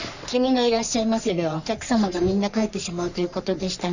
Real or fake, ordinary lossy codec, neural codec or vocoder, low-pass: fake; none; codec, 44.1 kHz, 3.4 kbps, Pupu-Codec; 7.2 kHz